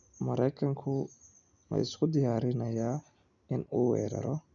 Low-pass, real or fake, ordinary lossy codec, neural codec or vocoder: 7.2 kHz; real; none; none